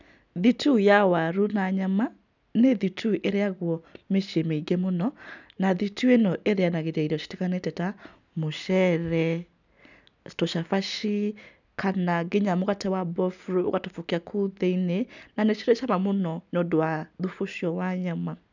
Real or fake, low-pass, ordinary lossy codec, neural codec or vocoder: real; 7.2 kHz; none; none